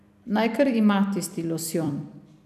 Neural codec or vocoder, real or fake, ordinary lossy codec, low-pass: vocoder, 44.1 kHz, 128 mel bands every 256 samples, BigVGAN v2; fake; none; 14.4 kHz